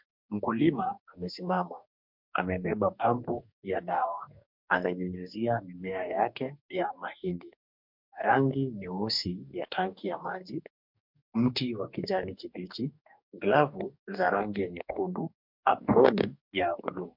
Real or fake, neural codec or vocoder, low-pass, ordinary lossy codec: fake; codec, 44.1 kHz, 2.6 kbps, DAC; 5.4 kHz; MP3, 48 kbps